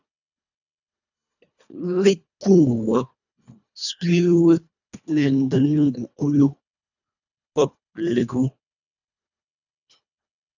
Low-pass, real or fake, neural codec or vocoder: 7.2 kHz; fake; codec, 24 kHz, 1.5 kbps, HILCodec